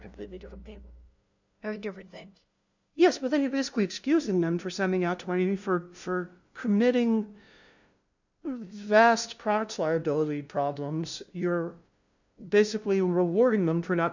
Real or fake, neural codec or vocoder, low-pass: fake; codec, 16 kHz, 0.5 kbps, FunCodec, trained on LibriTTS, 25 frames a second; 7.2 kHz